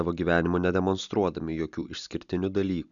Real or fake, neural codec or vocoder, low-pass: real; none; 7.2 kHz